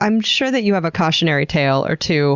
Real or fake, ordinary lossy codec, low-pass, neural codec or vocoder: real; Opus, 64 kbps; 7.2 kHz; none